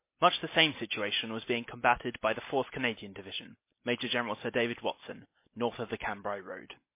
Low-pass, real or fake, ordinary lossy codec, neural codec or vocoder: 3.6 kHz; real; MP3, 24 kbps; none